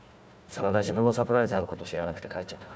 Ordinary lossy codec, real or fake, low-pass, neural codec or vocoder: none; fake; none; codec, 16 kHz, 1 kbps, FunCodec, trained on Chinese and English, 50 frames a second